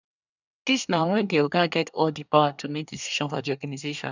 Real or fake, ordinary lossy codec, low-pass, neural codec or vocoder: fake; none; 7.2 kHz; codec, 24 kHz, 1 kbps, SNAC